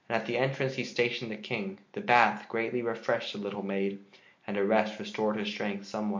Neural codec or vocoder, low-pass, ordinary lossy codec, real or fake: none; 7.2 kHz; MP3, 48 kbps; real